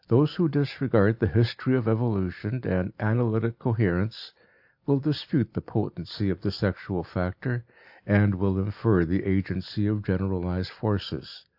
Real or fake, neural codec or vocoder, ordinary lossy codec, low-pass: real; none; AAC, 48 kbps; 5.4 kHz